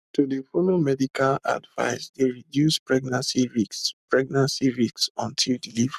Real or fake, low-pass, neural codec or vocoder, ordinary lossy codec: fake; 14.4 kHz; codec, 44.1 kHz, 7.8 kbps, Pupu-Codec; none